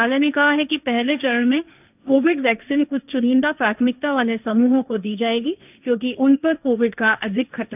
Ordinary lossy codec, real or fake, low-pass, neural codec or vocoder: none; fake; 3.6 kHz; codec, 16 kHz, 1.1 kbps, Voila-Tokenizer